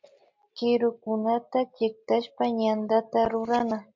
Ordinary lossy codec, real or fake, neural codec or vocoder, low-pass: MP3, 48 kbps; real; none; 7.2 kHz